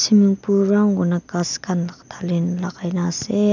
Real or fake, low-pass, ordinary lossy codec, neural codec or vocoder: real; 7.2 kHz; none; none